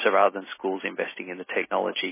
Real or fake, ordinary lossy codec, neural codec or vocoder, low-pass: real; MP3, 16 kbps; none; 3.6 kHz